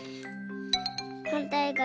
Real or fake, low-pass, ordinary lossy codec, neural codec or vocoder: real; none; none; none